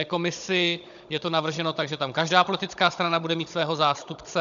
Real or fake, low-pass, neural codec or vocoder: fake; 7.2 kHz; codec, 16 kHz, 16 kbps, FunCodec, trained on Chinese and English, 50 frames a second